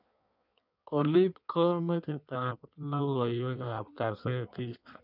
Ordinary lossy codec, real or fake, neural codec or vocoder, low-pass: none; fake; codec, 16 kHz in and 24 kHz out, 1.1 kbps, FireRedTTS-2 codec; 5.4 kHz